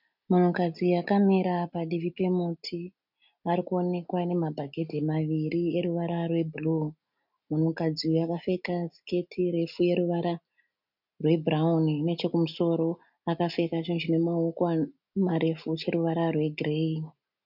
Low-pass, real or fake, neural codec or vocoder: 5.4 kHz; real; none